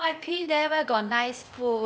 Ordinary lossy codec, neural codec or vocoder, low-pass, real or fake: none; codec, 16 kHz, 0.8 kbps, ZipCodec; none; fake